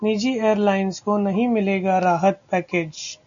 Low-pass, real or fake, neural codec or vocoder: 7.2 kHz; real; none